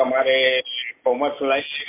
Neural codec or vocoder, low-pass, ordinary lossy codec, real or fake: none; 3.6 kHz; MP3, 24 kbps; real